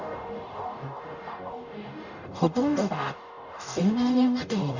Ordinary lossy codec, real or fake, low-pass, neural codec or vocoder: none; fake; 7.2 kHz; codec, 44.1 kHz, 0.9 kbps, DAC